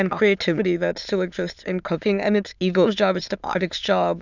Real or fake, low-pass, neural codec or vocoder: fake; 7.2 kHz; autoencoder, 22.05 kHz, a latent of 192 numbers a frame, VITS, trained on many speakers